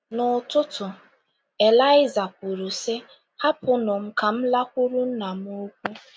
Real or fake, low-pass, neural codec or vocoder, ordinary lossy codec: real; none; none; none